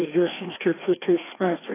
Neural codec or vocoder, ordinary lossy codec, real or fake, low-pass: codec, 16 kHz, 2 kbps, FreqCodec, larger model; AAC, 16 kbps; fake; 3.6 kHz